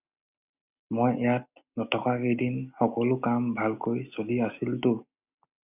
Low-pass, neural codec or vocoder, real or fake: 3.6 kHz; none; real